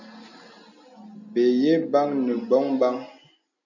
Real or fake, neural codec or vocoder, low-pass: real; none; 7.2 kHz